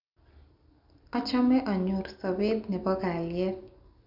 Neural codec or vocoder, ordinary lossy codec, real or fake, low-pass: none; none; real; 5.4 kHz